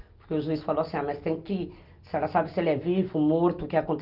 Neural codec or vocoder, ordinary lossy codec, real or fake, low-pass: none; Opus, 24 kbps; real; 5.4 kHz